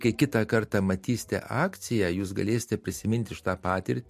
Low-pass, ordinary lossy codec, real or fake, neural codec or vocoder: 14.4 kHz; MP3, 64 kbps; real; none